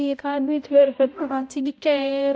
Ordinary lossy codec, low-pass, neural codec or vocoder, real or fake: none; none; codec, 16 kHz, 0.5 kbps, X-Codec, HuBERT features, trained on balanced general audio; fake